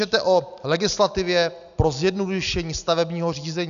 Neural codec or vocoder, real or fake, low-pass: none; real; 7.2 kHz